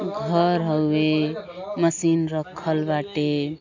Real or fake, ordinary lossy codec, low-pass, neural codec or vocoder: real; AAC, 48 kbps; 7.2 kHz; none